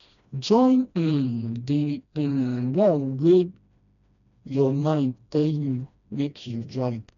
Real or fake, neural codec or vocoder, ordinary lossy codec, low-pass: fake; codec, 16 kHz, 1 kbps, FreqCodec, smaller model; none; 7.2 kHz